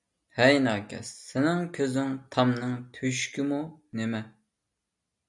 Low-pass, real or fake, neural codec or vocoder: 10.8 kHz; real; none